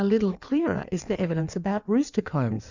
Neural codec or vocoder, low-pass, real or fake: codec, 16 kHz in and 24 kHz out, 1.1 kbps, FireRedTTS-2 codec; 7.2 kHz; fake